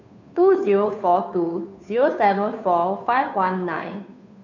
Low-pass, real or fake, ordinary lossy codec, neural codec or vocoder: 7.2 kHz; fake; none; codec, 16 kHz, 2 kbps, FunCodec, trained on Chinese and English, 25 frames a second